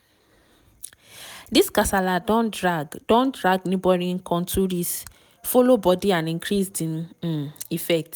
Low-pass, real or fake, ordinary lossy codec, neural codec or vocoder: none; real; none; none